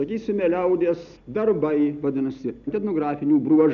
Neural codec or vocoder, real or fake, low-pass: none; real; 7.2 kHz